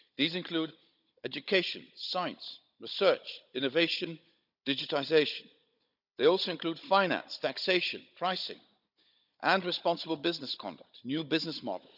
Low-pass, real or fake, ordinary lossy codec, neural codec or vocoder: 5.4 kHz; fake; none; codec, 16 kHz, 16 kbps, FunCodec, trained on Chinese and English, 50 frames a second